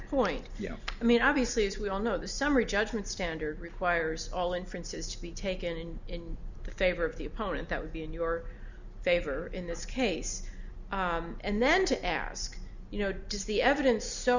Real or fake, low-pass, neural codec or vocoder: real; 7.2 kHz; none